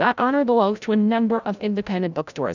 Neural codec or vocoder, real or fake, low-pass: codec, 16 kHz, 0.5 kbps, FreqCodec, larger model; fake; 7.2 kHz